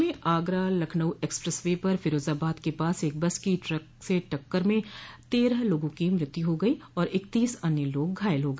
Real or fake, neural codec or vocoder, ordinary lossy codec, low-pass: real; none; none; none